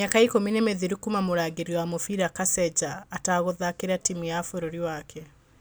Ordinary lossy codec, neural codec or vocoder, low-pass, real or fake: none; none; none; real